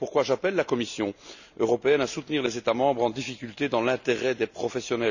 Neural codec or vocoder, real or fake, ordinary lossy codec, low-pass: none; real; none; 7.2 kHz